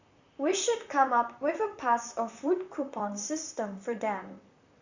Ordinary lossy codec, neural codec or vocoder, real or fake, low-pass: Opus, 64 kbps; vocoder, 44.1 kHz, 128 mel bands, Pupu-Vocoder; fake; 7.2 kHz